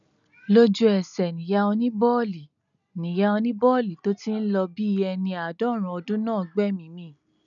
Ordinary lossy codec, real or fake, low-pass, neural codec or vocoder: none; real; 7.2 kHz; none